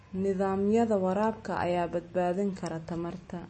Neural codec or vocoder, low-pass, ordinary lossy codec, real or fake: none; 10.8 kHz; MP3, 32 kbps; real